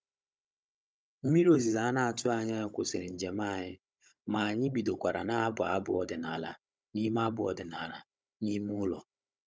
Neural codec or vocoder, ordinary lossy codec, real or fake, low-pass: codec, 16 kHz, 16 kbps, FunCodec, trained on Chinese and English, 50 frames a second; none; fake; none